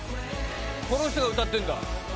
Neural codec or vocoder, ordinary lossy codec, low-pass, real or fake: none; none; none; real